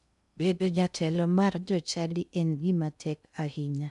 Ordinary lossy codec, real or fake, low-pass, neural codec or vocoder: none; fake; 10.8 kHz; codec, 16 kHz in and 24 kHz out, 0.6 kbps, FocalCodec, streaming, 2048 codes